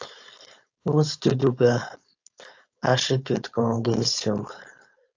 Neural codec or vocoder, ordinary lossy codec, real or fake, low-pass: codec, 16 kHz, 4.8 kbps, FACodec; AAC, 48 kbps; fake; 7.2 kHz